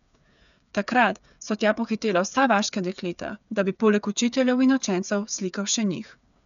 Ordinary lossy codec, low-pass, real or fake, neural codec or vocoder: none; 7.2 kHz; fake; codec, 16 kHz, 8 kbps, FreqCodec, smaller model